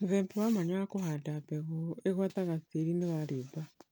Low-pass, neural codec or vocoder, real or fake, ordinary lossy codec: none; none; real; none